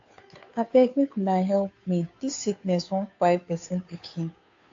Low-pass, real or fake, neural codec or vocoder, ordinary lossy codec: 7.2 kHz; fake; codec, 16 kHz, 2 kbps, FunCodec, trained on Chinese and English, 25 frames a second; AAC, 48 kbps